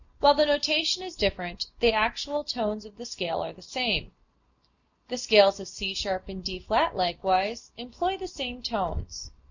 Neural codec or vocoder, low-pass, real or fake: none; 7.2 kHz; real